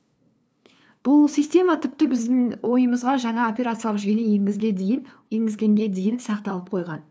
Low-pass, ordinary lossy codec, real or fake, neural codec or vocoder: none; none; fake; codec, 16 kHz, 2 kbps, FunCodec, trained on LibriTTS, 25 frames a second